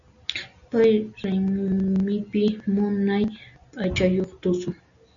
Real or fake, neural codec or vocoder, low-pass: real; none; 7.2 kHz